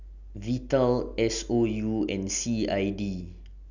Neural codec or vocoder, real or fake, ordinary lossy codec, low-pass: none; real; none; 7.2 kHz